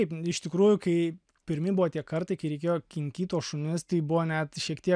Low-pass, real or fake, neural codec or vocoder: 9.9 kHz; real; none